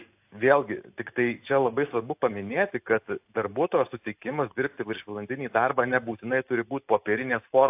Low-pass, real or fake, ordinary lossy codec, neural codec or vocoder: 3.6 kHz; real; AAC, 32 kbps; none